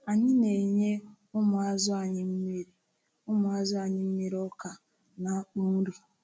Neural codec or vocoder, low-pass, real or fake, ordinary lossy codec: none; none; real; none